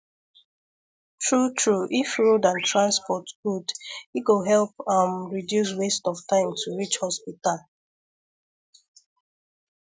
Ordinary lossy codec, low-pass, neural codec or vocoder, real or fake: none; none; none; real